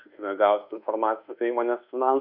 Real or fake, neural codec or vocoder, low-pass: fake; codec, 24 kHz, 1.2 kbps, DualCodec; 5.4 kHz